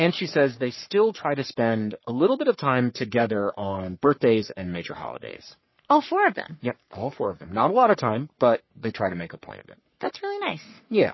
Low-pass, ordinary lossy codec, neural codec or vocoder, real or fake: 7.2 kHz; MP3, 24 kbps; codec, 44.1 kHz, 3.4 kbps, Pupu-Codec; fake